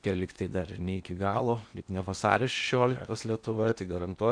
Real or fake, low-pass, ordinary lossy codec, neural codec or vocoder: fake; 9.9 kHz; AAC, 64 kbps; codec, 16 kHz in and 24 kHz out, 0.8 kbps, FocalCodec, streaming, 65536 codes